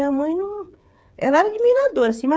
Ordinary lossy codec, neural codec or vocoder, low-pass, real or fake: none; codec, 16 kHz, 8 kbps, FreqCodec, smaller model; none; fake